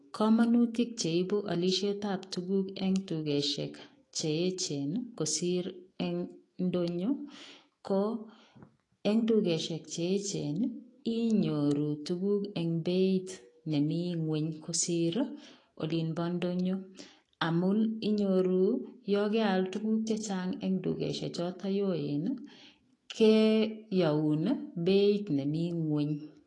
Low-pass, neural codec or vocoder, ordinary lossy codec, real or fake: 10.8 kHz; autoencoder, 48 kHz, 128 numbers a frame, DAC-VAE, trained on Japanese speech; AAC, 32 kbps; fake